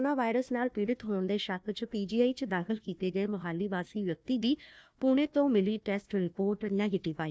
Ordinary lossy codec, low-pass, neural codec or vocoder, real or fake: none; none; codec, 16 kHz, 1 kbps, FunCodec, trained on Chinese and English, 50 frames a second; fake